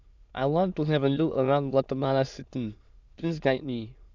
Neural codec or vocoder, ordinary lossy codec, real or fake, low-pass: autoencoder, 22.05 kHz, a latent of 192 numbers a frame, VITS, trained on many speakers; Opus, 64 kbps; fake; 7.2 kHz